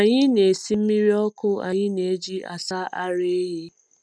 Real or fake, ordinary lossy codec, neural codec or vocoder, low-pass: real; none; none; none